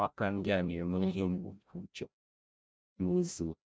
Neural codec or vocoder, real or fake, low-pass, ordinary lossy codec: codec, 16 kHz, 0.5 kbps, FreqCodec, larger model; fake; none; none